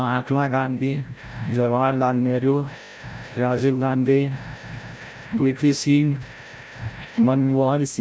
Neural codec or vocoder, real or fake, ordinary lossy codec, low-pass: codec, 16 kHz, 0.5 kbps, FreqCodec, larger model; fake; none; none